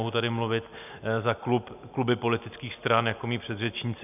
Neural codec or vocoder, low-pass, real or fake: none; 3.6 kHz; real